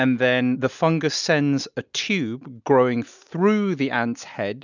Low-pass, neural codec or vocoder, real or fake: 7.2 kHz; none; real